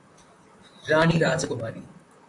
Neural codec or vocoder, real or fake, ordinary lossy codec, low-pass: vocoder, 44.1 kHz, 128 mel bands, Pupu-Vocoder; fake; AAC, 64 kbps; 10.8 kHz